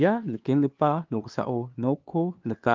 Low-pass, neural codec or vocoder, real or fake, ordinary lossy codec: 7.2 kHz; codec, 16 kHz, 2 kbps, FunCodec, trained on LibriTTS, 25 frames a second; fake; Opus, 32 kbps